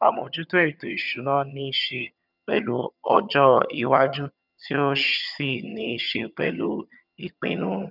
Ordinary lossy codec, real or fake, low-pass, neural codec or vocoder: none; fake; 5.4 kHz; vocoder, 22.05 kHz, 80 mel bands, HiFi-GAN